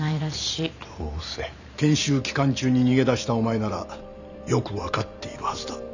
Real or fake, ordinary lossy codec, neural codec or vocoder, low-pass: fake; none; vocoder, 44.1 kHz, 128 mel bands every 512 samples, BigVGAN v2; 7.2 kHz